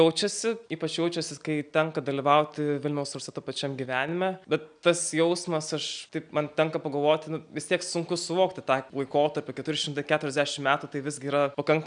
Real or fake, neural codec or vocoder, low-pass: real; none; 9.9 kHz